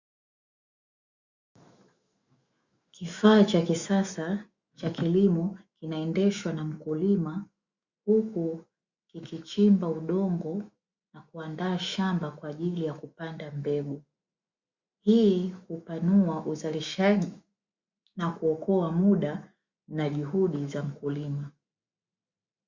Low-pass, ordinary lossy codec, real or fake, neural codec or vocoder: 7.2 kHz; Opus, 64 kbps; fake; autoencoder, 48 kHz, 128 numbers a frame, DAC-VAE, trained on Japanese speech